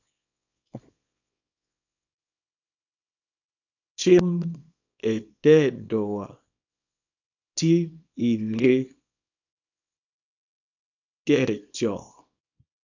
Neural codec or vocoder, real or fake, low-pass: codec, 24 kHz, 0.9 kbps, WavTokenizer, small release; fake; 7.2 kHz